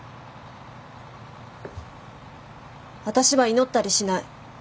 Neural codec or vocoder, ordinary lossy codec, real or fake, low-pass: none; none; real; none